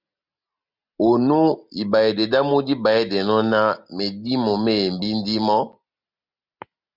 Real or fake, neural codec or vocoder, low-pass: real; none; 5.4 kHz